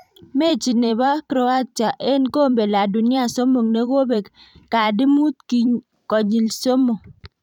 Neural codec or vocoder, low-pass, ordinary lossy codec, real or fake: vocoder, 44.1 kHz, 128 mel bands every 512 samples, BigVGAN v2; 19.8 kHz; none; fake